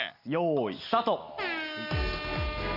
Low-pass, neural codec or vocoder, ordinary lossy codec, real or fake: 5.4 kHz; none; none; real